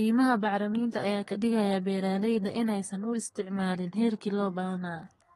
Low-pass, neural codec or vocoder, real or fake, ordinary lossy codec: 14.4 kHz; codec, 32 kHz, 1.9 kbps, SNAC; fake; AAC, 32 kbps